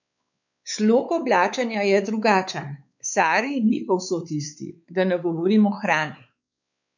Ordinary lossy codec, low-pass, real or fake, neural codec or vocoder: none; 7.2 kHz; fake; codec, 16 kHz, 4 kbps, X-Codec, WavLM features, trained on Multilingual LibriSpeech